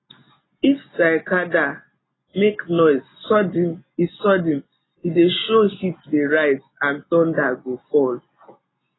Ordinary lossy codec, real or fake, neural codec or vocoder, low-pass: AAC, 16 kbps; real; none; 7.2 kHz